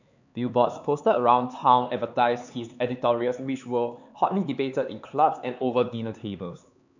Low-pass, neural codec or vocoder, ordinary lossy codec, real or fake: 7.2 kHz; codec, 16 kHz, 4 kbps, X-Codec, HuBERT features, trained on LibriSpeech; none; fake